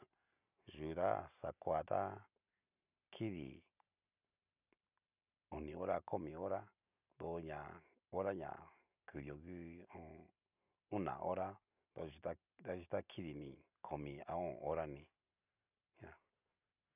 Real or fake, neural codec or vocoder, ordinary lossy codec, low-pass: real; none; none; 3.6 kHz